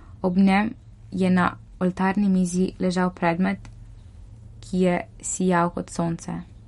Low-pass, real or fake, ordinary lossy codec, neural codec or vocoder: 19.8 kHz; real; MP3, 48 kbps; none